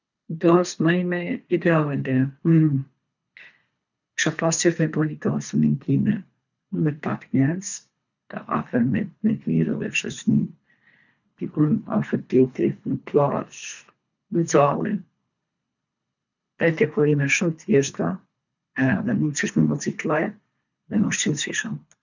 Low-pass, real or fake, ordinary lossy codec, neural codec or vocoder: 7.2 kHz; fake; none; codec, 24 kHz, 3 kbps, HILCodec